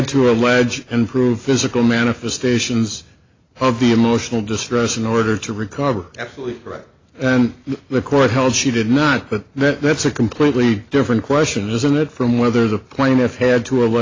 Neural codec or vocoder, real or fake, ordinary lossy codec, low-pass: none; real; AAC, 32 kbps; 7.2 kHz